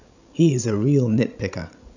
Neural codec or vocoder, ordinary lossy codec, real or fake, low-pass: codec, 16 kHz, 16 kbps, FreqCodec, larger model; none; fake; 7.2 kHz